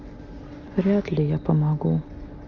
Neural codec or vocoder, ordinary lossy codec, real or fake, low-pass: none; Opus, 32 kbps; real; 7.2 kHz